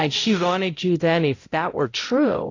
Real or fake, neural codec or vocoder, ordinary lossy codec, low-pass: fake; codec, 16 kHz, 0.5 kbps, X-Codec, HuBERT features, trained on balanced general audio; AAC, 32 kbps; 7.2 kHz